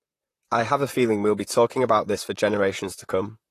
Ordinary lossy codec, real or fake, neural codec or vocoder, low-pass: AAC, 48 kbps; fake; vocoder, 44.1 kHz, 128 mel bands, Pupu-Vocoder; 14.4 kHz